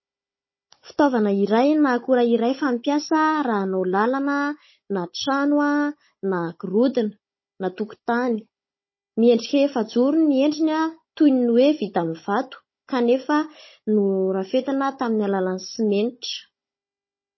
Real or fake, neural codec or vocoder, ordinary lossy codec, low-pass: fake; codec, 16 kHz, 16 kbps, FunCodec, trained on Chinese and English, 50 frames a second; MP3, 24 kbps; 7.2 kHz